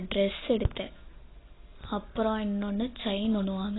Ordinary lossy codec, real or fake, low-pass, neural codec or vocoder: AAC, 16 kbps; real; 7.2 kHz; none